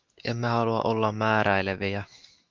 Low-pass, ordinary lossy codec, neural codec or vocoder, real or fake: 7.2 kHz; Opus, 32 kbps; autoencoder, 48 kHz, 128 numbers a frame, DAC-VAE, trained on Japanese speech; fake